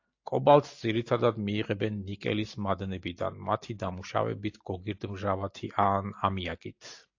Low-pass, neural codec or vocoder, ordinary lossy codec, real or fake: 7.2 kHz; none; AAC, 48 kbps; real